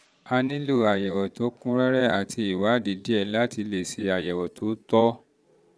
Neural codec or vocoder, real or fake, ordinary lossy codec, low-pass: vocoder, 22.05 kHz, 80 mel bands, Vocos; fake; none; none